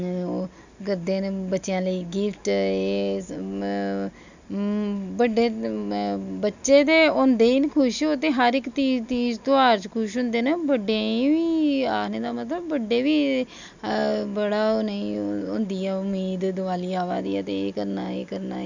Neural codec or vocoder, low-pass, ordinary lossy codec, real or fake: none; 7.2 kHz; none; real